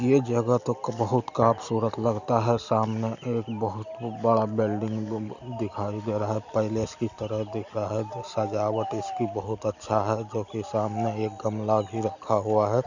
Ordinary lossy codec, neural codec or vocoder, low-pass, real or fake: none; none; 7.2 kHz; real